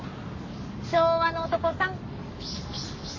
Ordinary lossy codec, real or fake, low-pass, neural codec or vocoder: MP3, 32 kbps; fake; 7.2 kHz; codec, 44.1 kHz, 7.8 kbps, Pupu-Codec